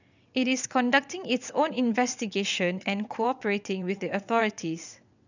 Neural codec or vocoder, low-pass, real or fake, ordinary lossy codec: vocoder, 22.05 kHz, 80 mel bands, WaveNeXt; 7.2 kHz; fake; none